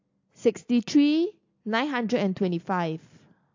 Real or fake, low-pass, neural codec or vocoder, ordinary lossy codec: real; 7.2 kHz; none; AAC, 48 kbps